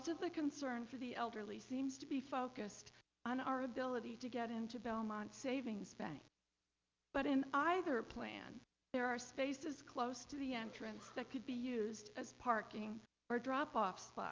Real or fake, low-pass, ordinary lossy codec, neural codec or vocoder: real; 7.2 kHz; Opus, 32 kbps; none